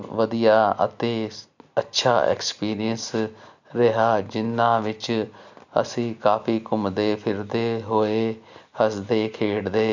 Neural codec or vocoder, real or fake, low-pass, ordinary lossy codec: none; real; 7.2 kHz; none